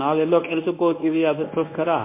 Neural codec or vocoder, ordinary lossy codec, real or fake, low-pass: codec, 24 kHz, 0.9 kbps, WavTokenizer, medium speech release version 1; MP3, 24 kbps; fake; 3.6 kHz